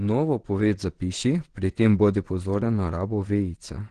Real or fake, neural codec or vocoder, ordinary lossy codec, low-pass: fake; vocoder, 22.05 kHz, 80 mel bands, WaveNeXt; Opus, 16 kbps; 9.9 kHz